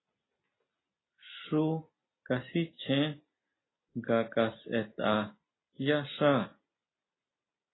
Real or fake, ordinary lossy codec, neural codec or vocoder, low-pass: real; AAC, 16 kbps; none; 7.2 kHz